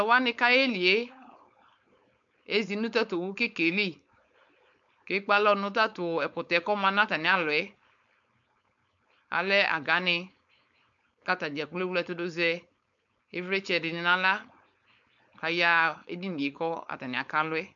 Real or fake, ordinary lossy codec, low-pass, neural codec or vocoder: fake; MP3, 64 kbps; 7.2 kHz; codec, 16 kHz, 4.8 kbps, FACodec